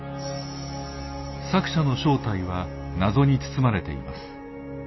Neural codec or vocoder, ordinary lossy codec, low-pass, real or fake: none; MP3, 24 kbps; 7.2 kHz; real